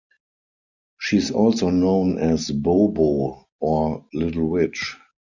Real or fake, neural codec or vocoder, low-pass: real; none; 7.2 kHz